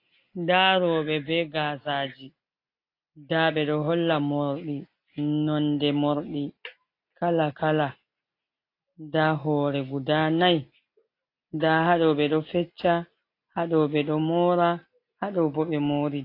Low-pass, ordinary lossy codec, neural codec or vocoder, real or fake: 5.4 kHz; AAC, 32 kbps; none; real